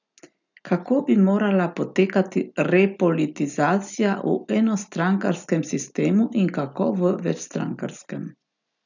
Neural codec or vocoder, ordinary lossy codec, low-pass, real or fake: none; none; 7.2 kHz; real